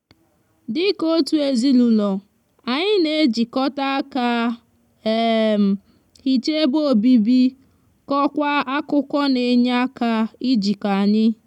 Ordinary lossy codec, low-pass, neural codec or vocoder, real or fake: none; 19.8 kHz; none; real